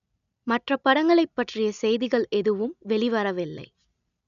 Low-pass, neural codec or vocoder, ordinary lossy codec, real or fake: 7.2 kHz; none; none; real